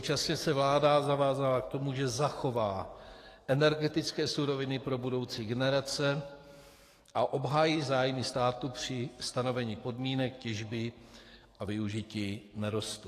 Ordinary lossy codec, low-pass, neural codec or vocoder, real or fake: AAC, 48 kbps; 14.4 kHz; codec, 44.1 kHz, 7.8 kbps, DAC; fake